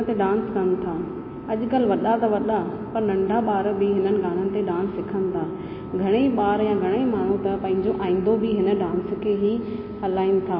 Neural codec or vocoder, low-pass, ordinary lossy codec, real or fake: none; 5.4 kHz; MP3, 24 kbps; real